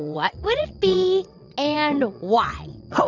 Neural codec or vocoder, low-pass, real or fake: vocoder, 22.05 kHz, 80 mel bands, WaveNeXt; 7.2 kHz; fake